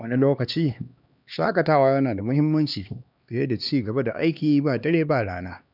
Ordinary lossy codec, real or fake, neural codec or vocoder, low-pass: none; fake; codec, 16 kHz, 2 kbps, X-Codec, HuBERT features, trained on LibriSpeech; 5.4 kHz